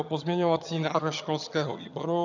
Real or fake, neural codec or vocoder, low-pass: fake; vocoder, 22.05 kHz, 80 mel bands, HiFi-GAN; 7.2 kHz